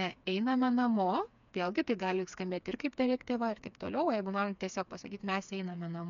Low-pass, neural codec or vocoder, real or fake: 7.2 kHz; codec, 16 kHz, 4 kbps, FreqCodec, smaller model; fake